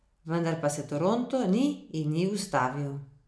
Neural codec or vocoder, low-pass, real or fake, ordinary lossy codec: none; none; real; none